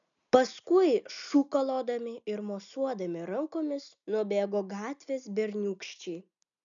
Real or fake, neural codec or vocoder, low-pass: real; none; 7.2 kHz